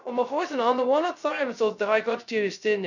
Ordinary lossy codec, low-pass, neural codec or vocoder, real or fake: none; 7.2 kHz; codec, 16 kHz, 0.2 kbps, FocalCodec; fake